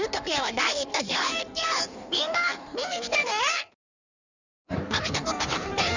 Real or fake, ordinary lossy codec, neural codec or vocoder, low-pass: fake; none; codec, 16 kHz in and 24 kHz out, 1.1 kbps, FireRedTTS-2 codec; 7.2 kHz